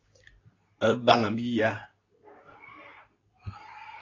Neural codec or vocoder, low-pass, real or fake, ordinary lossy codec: codec, 24 kHz, 0.9 kbps, WavTokenizer, medium speech release version 2; 7.2 kHz; fake; AAC, 32 kbps